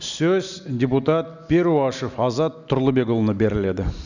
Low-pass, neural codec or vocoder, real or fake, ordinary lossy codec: 7.2 kHz; none; real; none